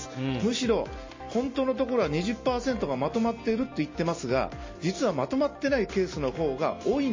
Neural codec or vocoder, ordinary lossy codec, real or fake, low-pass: none; MP3, 32 kbps; real; 7.2 kHz